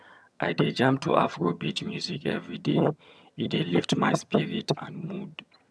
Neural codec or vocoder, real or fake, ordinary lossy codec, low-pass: vocoder, 22.05 kHz, 80 mel bands, HiFi-GAN; fake; none; none